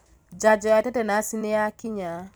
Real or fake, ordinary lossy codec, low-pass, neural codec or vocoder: fake; none; none; vocoder, 44.1 kHz, 128 mel bands every 256 samples, BigVGAN v2